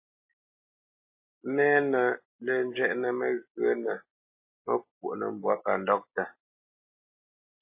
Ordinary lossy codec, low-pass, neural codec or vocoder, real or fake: MP3, 24 kbps; 3.6 kHz; none; real